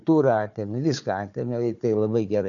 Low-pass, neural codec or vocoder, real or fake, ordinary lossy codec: 7.2 kHz; codec, 16 kHz, 4 kbps, FunCodec, trained on Chinese and English, 50 frames a second; fake; MP3, 96 kbps